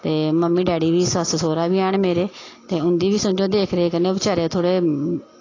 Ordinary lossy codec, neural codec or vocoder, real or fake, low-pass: AAC, 32 kbps; none; real; 7.2 kHz